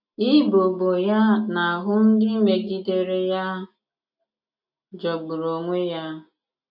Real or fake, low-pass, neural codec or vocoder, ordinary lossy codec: real; 5.4 kHz; none; none